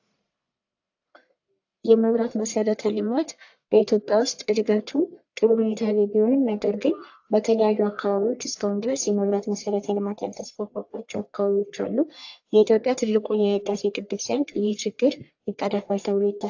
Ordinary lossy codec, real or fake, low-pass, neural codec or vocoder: AAC, 48 kbps; fake; 7.2 kHz; codec, 44.1 kHz, 1.7 kbps, Pupu-Codec